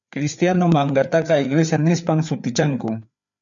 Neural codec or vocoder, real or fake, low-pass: codec, 16 kHz, 4 kbps, FreqCodec, larger model; fake; 7.2 kHz